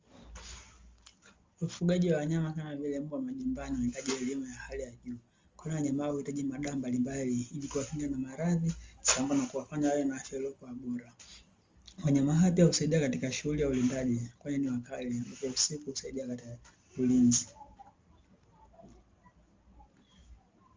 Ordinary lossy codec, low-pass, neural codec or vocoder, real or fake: Opus, 32 kbps; 7.2 kHz; none; real